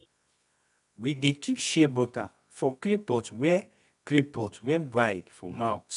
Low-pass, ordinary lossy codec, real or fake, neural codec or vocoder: 10.8 kHz; none; fake; codec, 24 kHz, 0.9 kbps, WavTokenizer, medium music audio release